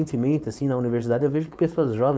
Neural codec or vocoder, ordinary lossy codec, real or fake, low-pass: codec, 16 kHz, 4.8 kbps, FACodec; none; fake; none